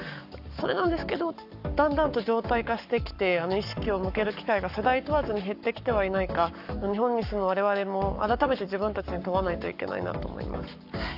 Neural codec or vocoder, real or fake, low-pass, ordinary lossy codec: codec, 44.1 kHz, 7.8 kbps, Pupu-Codec; fake; 5.4 kHz; AAC, 48 kbps